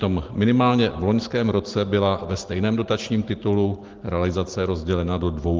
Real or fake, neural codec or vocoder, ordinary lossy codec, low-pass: real; none; Opus, 16 kbps; 7.2 kHz